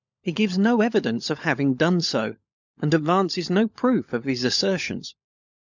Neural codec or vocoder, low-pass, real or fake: codec, 16 kHz, 16 kbps, FunCodec, trained on LibriTTS, 50 frames a second; 7.2 kHz; fake